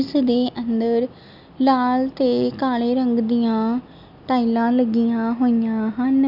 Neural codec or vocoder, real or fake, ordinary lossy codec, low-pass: none; real; none; 5.4 kHz